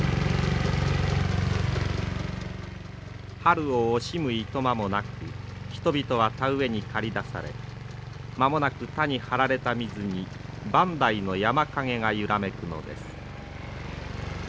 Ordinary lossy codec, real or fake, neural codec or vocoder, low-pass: none; real; none; none